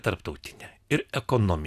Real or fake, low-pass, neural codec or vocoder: real; 14.4 kHz; none